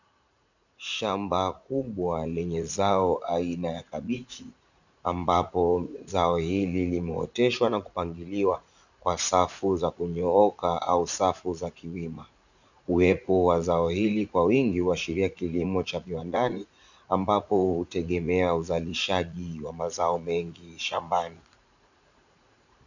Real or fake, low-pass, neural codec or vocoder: fake; 7.2 kHz; vocoder, 44.1 kHz, 80 mel bands, Vocos